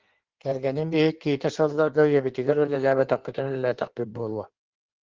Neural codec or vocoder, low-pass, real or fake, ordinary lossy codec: codec, 16 kHz in and 24 kHz out, 1.1 kbps, FireRedTTS-2 codec; 7.2 kHz; fake; Opus, 16 kbps